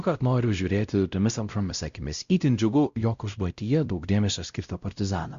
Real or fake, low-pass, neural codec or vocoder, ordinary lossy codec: fake; 7.2 kHz; codec, 16 kHz, 0.5 kbps, X-Codec, WavLM features, trained on Multilingual LibriSpeech; Opus, 64 kbps